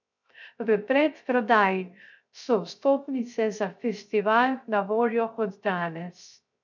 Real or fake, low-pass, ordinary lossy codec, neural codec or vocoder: fake; 7.2 kHz; none; codec, 16 kHz, 0.3 kbps, FocalCodec